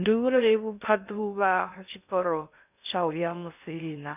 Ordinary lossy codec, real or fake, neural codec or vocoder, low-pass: none; fake; codec, 16 kHz in and 24 kHz out, 0.6 kbps, FocalCodec, streaming, 2048 codes; 3.6 kHz